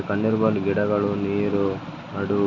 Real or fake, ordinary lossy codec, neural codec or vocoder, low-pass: real; none; none; 7.2 kHz